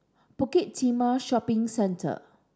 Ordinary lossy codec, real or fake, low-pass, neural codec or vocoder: none; real; none; none